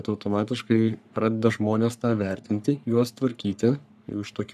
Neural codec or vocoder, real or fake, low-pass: codec, 44.1 kHz, 3.4 kbps, Pupu-Codec; fake; 14.4 kHz